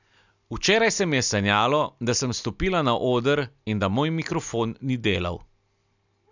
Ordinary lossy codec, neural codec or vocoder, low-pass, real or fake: none; none; 7.2 kHz; real